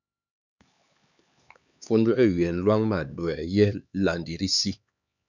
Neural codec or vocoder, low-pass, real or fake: codec, 16 kHz, 4 kbps, X-Codec, HuBERT features, trained on LibriSpeech; 7.2 kHz; fake